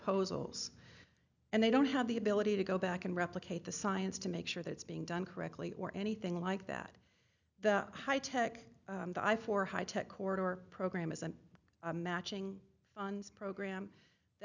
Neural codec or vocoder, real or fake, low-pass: none; real; 7.2 kHz